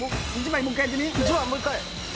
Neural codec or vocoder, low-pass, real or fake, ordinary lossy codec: none; none; real; none